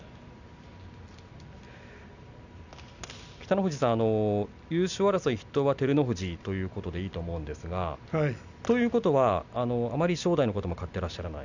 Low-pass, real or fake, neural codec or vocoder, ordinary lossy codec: 7.2 kHz; real; none; none